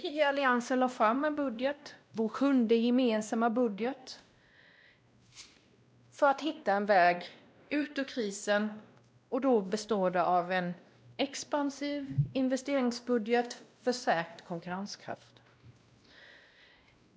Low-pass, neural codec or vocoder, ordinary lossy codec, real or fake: none; codec, 16 kHz, 1 kbps, X-Codec, WavLM features, trained on Multilingual LibriSpeech; none; fake